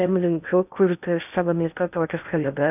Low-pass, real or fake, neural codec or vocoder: 3.6 kHz; fake; codec, 16 kHz in and 24 kHz out, 0.6 kbps, FocalCodec, streaming, 2048 codes